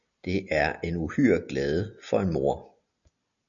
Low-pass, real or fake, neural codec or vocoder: 7.2 kHz; real; none